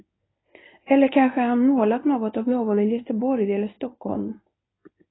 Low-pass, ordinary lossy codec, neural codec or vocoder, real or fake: 7.2 kHz; AAC, 16 kbps; codec, 24 kHz, 0.9 kbps, WavTokenizer, medium speech release version 1; fake